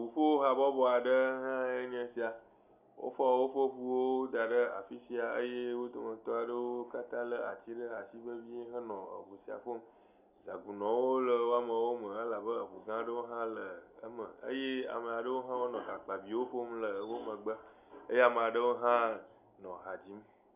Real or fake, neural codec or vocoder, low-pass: real; none; 3.6 kHz